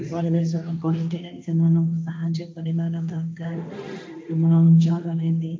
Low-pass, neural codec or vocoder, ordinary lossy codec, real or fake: none; codec, 16 kHz, 1.1 kbps, Voila-Tokenizer; none; fake